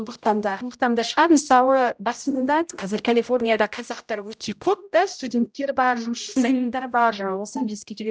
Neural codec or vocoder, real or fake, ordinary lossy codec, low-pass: codec, 16 kHz, 0.5 kbps, X-Codec, HuBERT features, trained on general audio; fake; none; none